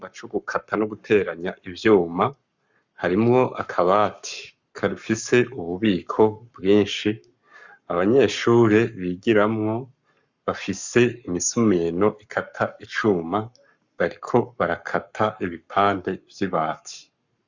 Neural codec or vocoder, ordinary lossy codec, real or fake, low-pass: codec, 44.1 kHz, 7.8 kbps, Pupu-Codec; Opus, 64 kbps; fake; 7.2 kHz